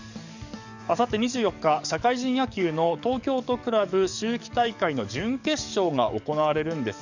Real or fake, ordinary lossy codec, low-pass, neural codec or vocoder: fake; none; 7.2 kHz; codec, 44.1 kHz, 7.8 kbps, DAC